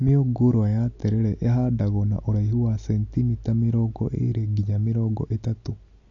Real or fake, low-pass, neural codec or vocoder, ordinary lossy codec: real; 7.2 kHz; none; AAC, 48 kbps